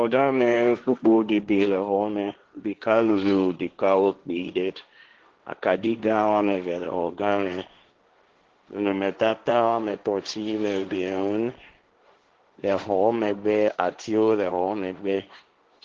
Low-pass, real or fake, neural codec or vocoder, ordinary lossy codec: 7.2 kHz; fake; codec, 16 kHz, 1.1 kbps, Voila-Tokenizer; Opus, 16 kbps